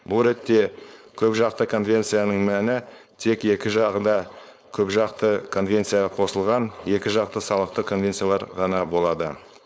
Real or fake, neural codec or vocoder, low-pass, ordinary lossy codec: fake; codec, 16 kHz, 4.8 kbps, FACodec; none; none